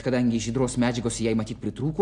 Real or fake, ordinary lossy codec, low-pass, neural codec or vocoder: real; AAC, 64 kbps; 10.8 kHz; none